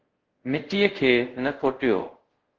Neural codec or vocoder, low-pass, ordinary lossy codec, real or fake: codec, 24 kHz, 0.5 kbps, DualCodec; 7.2 kHz; Opus, 16 kbps; fake